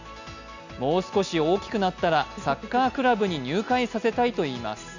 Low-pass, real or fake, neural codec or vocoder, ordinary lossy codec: 7.2 kHz; real; none; none